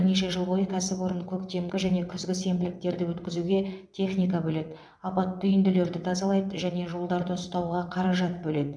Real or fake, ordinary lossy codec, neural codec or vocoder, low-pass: fake; none; vocoder, 22.05 kHz, 80 mel bands, WaveNeXt; none